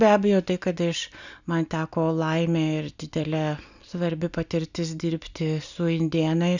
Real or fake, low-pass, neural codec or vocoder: real; 7.2 kHz; none